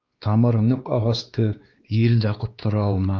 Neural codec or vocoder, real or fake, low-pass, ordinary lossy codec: codec, 16 kHz, 2 kbps, X-Codec, WavLM features, trained on Multilingual LibriSpeech; fake; 7.2 kHz; Opus, 24 kbps